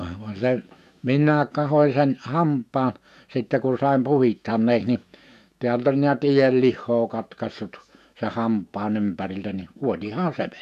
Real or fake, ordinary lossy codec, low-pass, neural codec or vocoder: fake; none; 14.4 kHz; autoencoder, 48 kHz, 128 numbers a frame, DAC-VAE, trained on Japanese speech